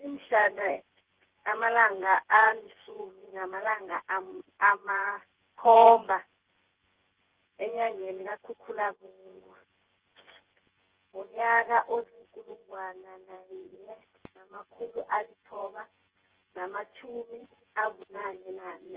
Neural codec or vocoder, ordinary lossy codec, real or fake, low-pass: vocoder, 24 kHz, 100 mel bands, Vocos; Opus, 16 kbps; fake; 3.6 kHz